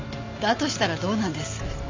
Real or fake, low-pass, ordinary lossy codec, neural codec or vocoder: real; 7.2 kHz; MP3, 64 kbps; none